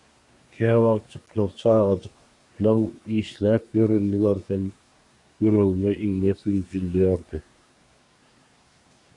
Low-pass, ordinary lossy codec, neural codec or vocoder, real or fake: 10.8 kHz; AAC, 64 kbps; codec, 24 kHz, 1 kbps, SNAC; fake